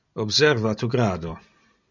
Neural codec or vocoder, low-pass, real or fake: none; 7.2 kHz; real